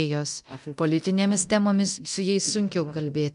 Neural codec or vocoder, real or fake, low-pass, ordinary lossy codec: codec, 24 kHz, 0.9 kbps, DualCodec; fake; 10.8 kHz; MP3, 96 kbps